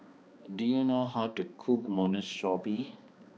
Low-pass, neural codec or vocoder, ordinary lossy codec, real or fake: none; codec, 16 kHz, 2 kbps, X-Codec, HuBERT features, trained on balanced general audio; none; fake